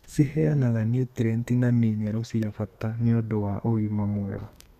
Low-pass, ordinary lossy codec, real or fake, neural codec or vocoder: 14.4 kHz; none; fake; codec, 32 kHz, 1.9 kbps, SNAC